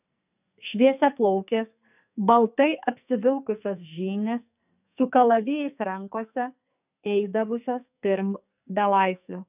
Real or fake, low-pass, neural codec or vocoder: fake; 3.6 kHz; codec, 44.1 kHz, 2.6 kbps, SNAC